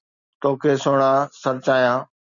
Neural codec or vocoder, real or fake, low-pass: none; real; 7.2 kHz